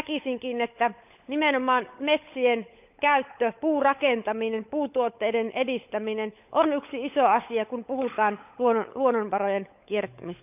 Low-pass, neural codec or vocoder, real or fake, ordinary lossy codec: 3.6 kHz; codec, 16 kHz, 16 kbps, FunCodec, trained on LibriTTS, 50 frames a second; fake; none